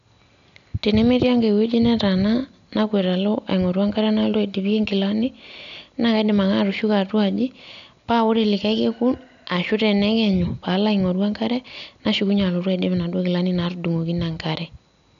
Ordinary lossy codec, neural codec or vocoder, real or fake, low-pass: none; none; real; 7.2 kHz